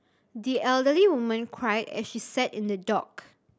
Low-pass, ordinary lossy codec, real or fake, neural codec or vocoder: none; none; real; none